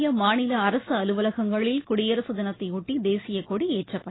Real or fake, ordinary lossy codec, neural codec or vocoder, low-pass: real; AAC, 16 kbps; none; 7.2 kHz